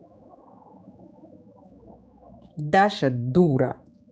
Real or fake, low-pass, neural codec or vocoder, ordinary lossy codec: fake; none; codec, 16 kHz, 4 kbps, X-Codec, HuBERT features, trained on general audio; none